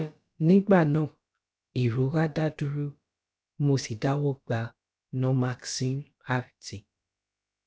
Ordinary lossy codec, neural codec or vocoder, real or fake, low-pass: none; codec, 16 kHz, about 1 kbps, DyCAST, with the encoder's durations; fake; none